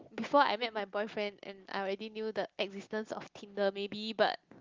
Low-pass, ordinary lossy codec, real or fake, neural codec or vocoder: 7.2 kHz; Opus, 32 kbps; real; none